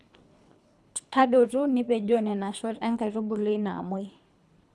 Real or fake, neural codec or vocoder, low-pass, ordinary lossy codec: fake; codec, 24 kHz, 3 kbps, HILCodec; none; none